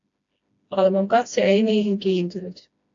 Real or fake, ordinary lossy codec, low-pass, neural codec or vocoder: fake; AAC, 64 kbps; 7.2 kHz; codec, 16 kHz, 1 kbps, FreqCodec, smaller model